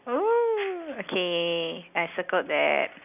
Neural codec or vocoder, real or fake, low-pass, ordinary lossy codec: none; real; 3.6 kHz; none